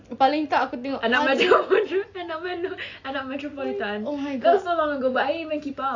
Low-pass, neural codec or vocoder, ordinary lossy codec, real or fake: 7.2 kHz; none; AAC, 48 kbps; real